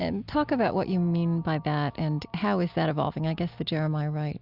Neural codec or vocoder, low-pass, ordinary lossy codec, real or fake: none; 5.4 kHz; Opus, 64 kbps; real